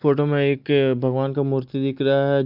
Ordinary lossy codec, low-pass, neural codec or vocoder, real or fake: none; 5.4 kHz; none; real